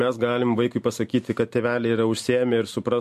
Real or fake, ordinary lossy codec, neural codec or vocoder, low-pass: real; MP3, 64 kbps; none; 14.4 kHz